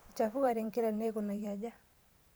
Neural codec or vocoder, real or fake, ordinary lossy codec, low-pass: vocoder, 44.1 kHz, 128 mel bands every 512 samples, BigVGAN v2; fake; none; none